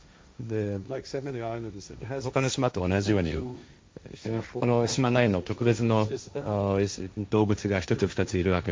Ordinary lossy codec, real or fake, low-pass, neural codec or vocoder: none; fake; none; codec, 16 kHz, 1.1 kbps, Voila-Tokenizer